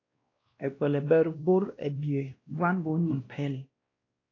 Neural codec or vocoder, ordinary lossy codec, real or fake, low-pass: codec, 16 kHz, 1 kbps, X-Codec, WavLM features, trained on Multilingual LibriSpeech; AAC, 32 kbps; fake; 7.2 kHz